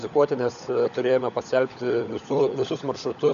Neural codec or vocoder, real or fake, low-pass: codec, 16 kHz, 16 kbps, FunCodec, trained on LibriTTS, 50 frames a second; fake; 7.2 kHz